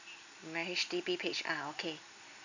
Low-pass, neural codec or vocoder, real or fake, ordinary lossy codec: 7.2 kHz; none; real; none